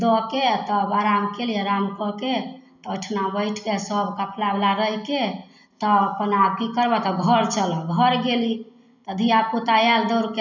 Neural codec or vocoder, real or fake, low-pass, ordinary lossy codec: none; real; 7.2 kHz; none